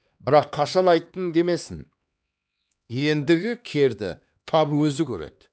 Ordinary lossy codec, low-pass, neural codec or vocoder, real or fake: none; none; codec, 16 kHz, 2 kbps, X-Codec, HuBERT features, trained on LibriSpeech; fake